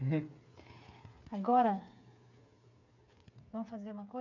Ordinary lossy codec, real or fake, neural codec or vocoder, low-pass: none; fake; codec, 16 kHz, 8 kbps, FreqCodec, smaller model; 7.2 kHz